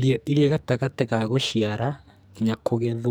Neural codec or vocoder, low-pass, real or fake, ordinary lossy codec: codec, 44.1 kHz, 2.6 kbps, SNAC; none; fake; none